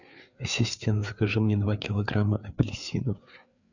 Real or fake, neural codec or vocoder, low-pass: fake; codec, 16 kHz, 4 kbps, FreqCodec, larger model; 7.2 kHz